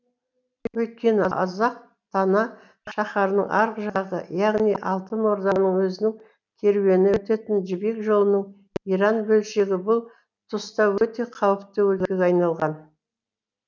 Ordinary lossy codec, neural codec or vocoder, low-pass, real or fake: none; none; 7.2 kHz; real